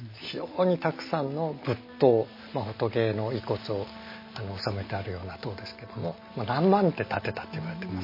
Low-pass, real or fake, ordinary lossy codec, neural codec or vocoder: 5.4 kHz; real; MP3, 24 kbps; none